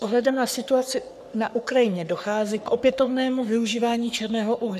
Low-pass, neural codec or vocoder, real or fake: 14.4 kHz; codec, 44.1 kHz, 3.4 kbps, Pupu-Codec; fake